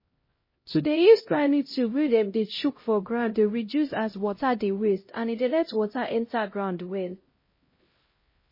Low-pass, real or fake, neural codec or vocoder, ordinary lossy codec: 5.4 kHz; fake; codec, 16 kHz, 0.5 kbps, X-Codec, HuBERT features, trained on LibriSpeech; MP3, 24 kbps